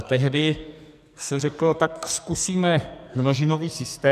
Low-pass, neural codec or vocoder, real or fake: 14.4 kHz; codec, 44.1 kHz, 2.6 kbps, SNAC; fake